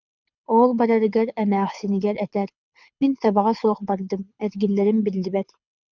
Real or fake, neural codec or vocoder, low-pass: fake; codec, 24 kHz, 6 kbps, HILCodec; 7.2 kHz